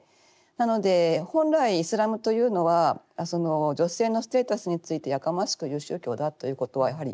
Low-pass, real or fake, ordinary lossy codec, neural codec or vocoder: none; real; none; none